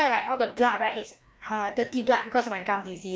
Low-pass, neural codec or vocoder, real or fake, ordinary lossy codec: none; codec, 16 kHz, 1 kbps, FreqCodec, larger model; fake; none